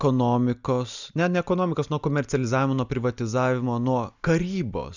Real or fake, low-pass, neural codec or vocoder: real; 7.2 kHz; none